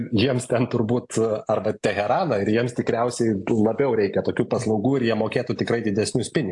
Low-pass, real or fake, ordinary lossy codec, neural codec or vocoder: 10.8 kHz; real; AAC, 64 kbps; none